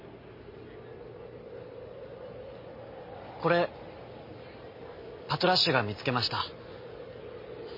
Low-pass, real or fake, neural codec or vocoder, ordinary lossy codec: 5.4 kHz; real; none; MP3, 24 kbps